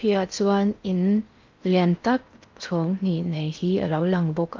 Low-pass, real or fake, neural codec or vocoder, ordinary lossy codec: 7.2 kHz; fake; codec, 16 kHz in and 24 kHz out, 0.6 kbps, FocalCodec, streaming, 2048 codes; Opus, 32 kbps